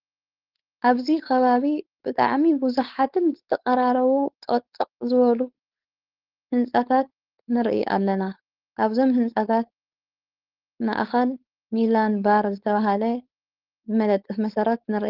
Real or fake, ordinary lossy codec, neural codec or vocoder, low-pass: fake; Opus, 16 kbps; codec, 16 kHz, 4.8 kbps, FACodec; 5.4 kHz